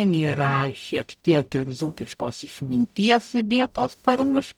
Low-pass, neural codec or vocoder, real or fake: 19.8 kHz; codec, 44.1 kHz, 0.9 kbps, DAC; fake